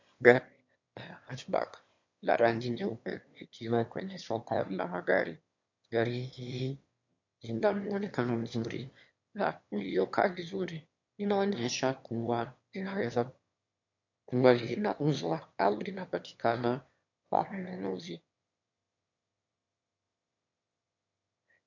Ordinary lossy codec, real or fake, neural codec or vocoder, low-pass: MP3, 48 kbps; fake; autoencoder, 22.05 kHz, a latent of 192 numbers a frame, VITS, trained on one speaker; 7.2 kHz